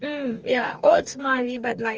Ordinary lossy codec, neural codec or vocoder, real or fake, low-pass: Opus, 24 kbps; codec, 44.1 kHz, 2.6 kbps, DAC; fake; 7.2 kHz